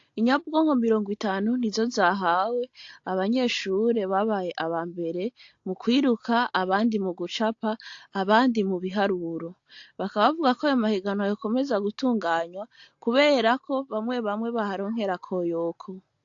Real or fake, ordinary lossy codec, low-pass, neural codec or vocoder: real; AAC, 48 kbps; 7.2 kHz; none